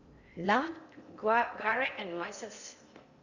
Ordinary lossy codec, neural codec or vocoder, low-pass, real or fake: Opus, 64 kbps; codec, 16 kHz in and 24 kHz out, 0.6 kbps, FocalCodec, streaming, 4096 codes; 7.2 kHz; fake